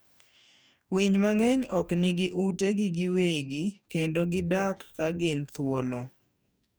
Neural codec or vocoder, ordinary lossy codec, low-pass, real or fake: codec, 44.1 kHz, 2.6 kbps, DAC; none; none; fake